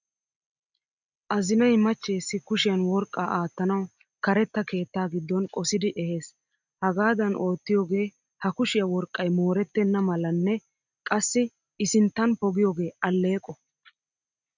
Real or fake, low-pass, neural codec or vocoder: real; 7.2 kHz; none